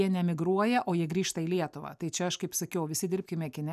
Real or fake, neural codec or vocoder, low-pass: real; none; 14.4 kHz